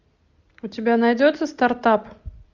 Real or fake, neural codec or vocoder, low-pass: real; none; 7.2 kHz